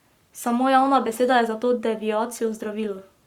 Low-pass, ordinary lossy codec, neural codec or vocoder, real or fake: 19.8 kHz; Opus, 64 kbps; codec, 44.1 kHz, 7.8 kbps, Pupu-Codec; fake